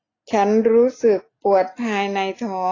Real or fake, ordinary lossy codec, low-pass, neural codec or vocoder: real; AAC, 32 kbps; 7.2 kHz; none